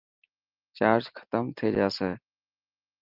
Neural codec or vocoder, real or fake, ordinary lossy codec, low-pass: none; real; Opus, 24 kbps; 5.4 kHz